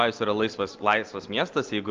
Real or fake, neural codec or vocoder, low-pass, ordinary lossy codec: real; none; 7.2 kHz; Opus, 24 kbps